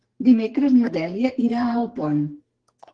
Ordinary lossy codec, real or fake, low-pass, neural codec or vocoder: Opus, 16 kbps; fake; 9.9 kHz; codec, 44.1 kHz, 2.6 kbps, SNAC